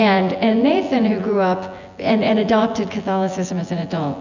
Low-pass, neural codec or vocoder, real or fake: 7.2 kHz; vocoder, 24 kHz, 100 mel bands, Vocos; fake